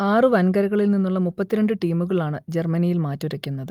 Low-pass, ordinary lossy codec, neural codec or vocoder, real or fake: 19.8 kHz; Opus, 32 kbps; none; real